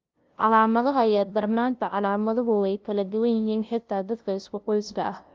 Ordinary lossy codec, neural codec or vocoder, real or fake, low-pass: Opus, 32 kbps; codec, 16 kHz, 0.5 kbps, FunCodec, trained on LibriTTS, 25 frames a second; fake; 7.2 kHz